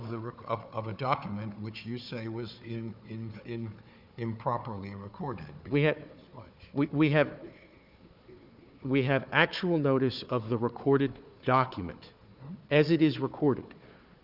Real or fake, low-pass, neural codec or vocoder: fake; 5.4 kHz; codec, 16 kHz, 4 kbps, FunCodec, trained on Chinese and English, 50 frames a second